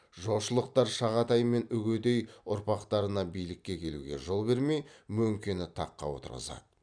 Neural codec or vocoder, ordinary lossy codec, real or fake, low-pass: vocoder, 44.1 kHz, 128 mel bands every 256 samples, BigVGAN v2; none; fake; 9.9 kHz